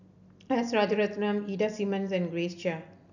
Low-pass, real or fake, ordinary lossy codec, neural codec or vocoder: 7.2 kHz; real; none; none